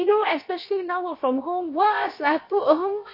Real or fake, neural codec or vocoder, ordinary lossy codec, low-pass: fake; codec, 16 kHz, about 1 kbps, DyCAST, with the encoder's durations; MP3, 32 kbps; 5.4 kHz